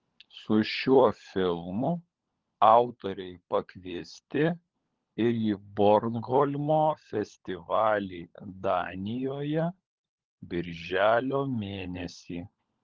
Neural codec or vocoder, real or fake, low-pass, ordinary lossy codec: codec, 16 kHz, 16 kbps, FunCodec, trained on LibriTTS, 50 frames a second; fake; 7.2 kHz; Opus, 16 kbps